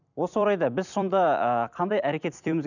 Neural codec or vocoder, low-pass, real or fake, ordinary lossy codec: vocoder, 44.1 kHz, 128 mel bands every 256 samples, BigVGAN v2; 7.2 kHz; fake; none